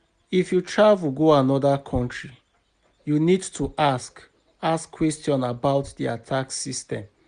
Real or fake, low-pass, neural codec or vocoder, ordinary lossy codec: real; 9.9 kHz; none; Opus, 64 kbps